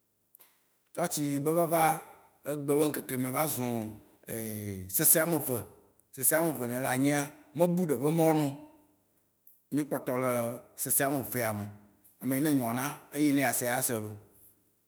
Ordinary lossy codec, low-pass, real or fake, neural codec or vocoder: none; none; fake; autoencoder, 48 kHz, 32 numbers a frame, DAC-VAE, trained on Japanese speech